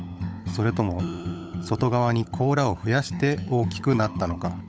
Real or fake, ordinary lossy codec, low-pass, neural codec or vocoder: fake; none; none; codec, 16 kHz, 16 kbps, FunCodec, trained on LibriTTS, 50 frames a second